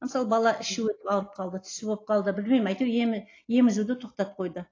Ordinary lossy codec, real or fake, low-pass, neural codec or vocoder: AAC, 32 kbps; real; 7.2 kHz; none